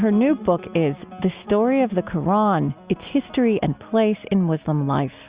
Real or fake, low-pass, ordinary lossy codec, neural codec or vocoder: real; 3.6 kHz; Opus, 64 kbps; none